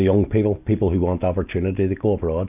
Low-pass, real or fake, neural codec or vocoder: 3.6 kHz; real; none